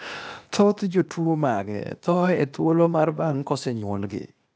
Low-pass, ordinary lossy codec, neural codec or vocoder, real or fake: none; none; codec, 16 kHz, 0.8 kbps, ZipCodec; fake